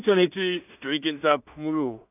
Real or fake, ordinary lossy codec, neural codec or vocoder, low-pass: fake; none; codec, 16 kHz in and 24 kHz out, 0.4 kbps, LongCat-Audio-Codec, two codebook decoder; 3.6 kHz